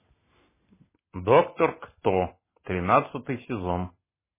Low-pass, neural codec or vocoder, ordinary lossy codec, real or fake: 3.6 kHz; none; MP3, 16 kbps; real